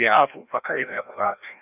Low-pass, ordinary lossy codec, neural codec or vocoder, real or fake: 3.6 kHz; none; codec, 16 kHz, 1 kbps, FreqCodec, larger model; fake